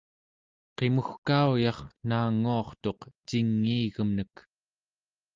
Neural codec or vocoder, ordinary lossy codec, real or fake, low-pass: none; Opus, 32 kbps; real; 7.2 kHz